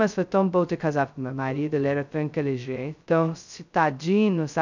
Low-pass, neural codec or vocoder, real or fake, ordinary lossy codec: 7.2 kHz; codec, 16 kHz, 0.2 kbps, FocalCodec; fake; none